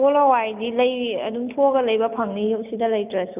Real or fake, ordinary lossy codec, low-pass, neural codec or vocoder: real; none; 3.6 kHz; none